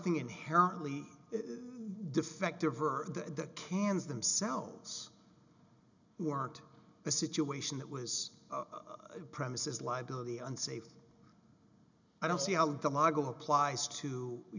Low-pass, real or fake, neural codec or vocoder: 7.2 kHz; real; none